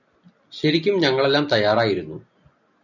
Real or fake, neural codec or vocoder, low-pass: real; none; 7.2 kHz